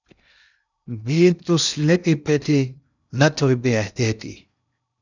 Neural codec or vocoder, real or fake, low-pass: codec, 16 kHz in and 24 kHz out, 0.6 kbps, FocalCodec, streaming, 2048 codes; fake; 7.2 kHz